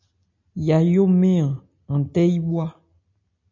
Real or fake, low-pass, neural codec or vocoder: real; 7.2 kHz; none